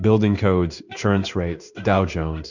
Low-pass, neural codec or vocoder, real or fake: 7.2 kHz; codec, 16 kHz in and 24 kHz out, 1 kbps, XY-Tokenizer; fake